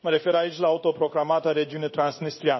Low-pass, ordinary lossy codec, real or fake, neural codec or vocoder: 7.2 kHz; MP3, 24 kbps; fake; codec, 16 kHz in and 24 kHz out, 1 kbps, XY-Tokenizer